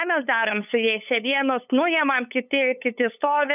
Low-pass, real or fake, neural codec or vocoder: 3.6 kHz; fake; codec, 16 kHz, 8 kbps, FunCodec, trained on LibriTTS, 25 frames a second